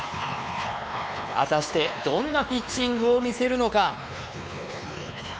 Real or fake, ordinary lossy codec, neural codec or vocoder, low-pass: fake; none; codec, 16 kHz, 2 kbps, X-Codec, WavLM features, trained on Multilingual LibriSpeech; none